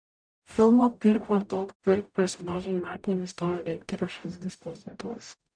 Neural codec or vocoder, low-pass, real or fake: codec, 44.1 kHz, 0.9 kbps, DAC; 9.9 kHz; fake